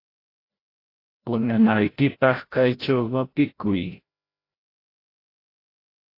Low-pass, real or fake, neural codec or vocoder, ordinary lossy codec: 5.4 kHz; fake; codec, 16 kHz, 0.5 kbps, FreqCodec, larger model; AAC, 32 kbps